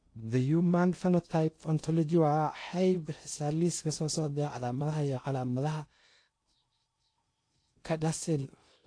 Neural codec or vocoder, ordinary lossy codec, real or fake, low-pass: codec, 16 kHz in and 24 kHz out, 0.6 kbps, FocalCodec, streaming, 2048 codes; AAC, 48 kbps; fake; 9.9 kHz